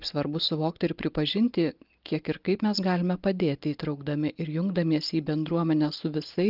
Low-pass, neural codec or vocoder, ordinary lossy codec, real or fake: 5.4 kHz; none; Opus, 32 kbps; real